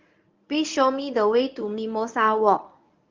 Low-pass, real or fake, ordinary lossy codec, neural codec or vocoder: 7.2 kHz; fake; Opus, 32 kbps; codec, 24 kHz, 0.9 kbps, WavTokenizer, medium speech release version 1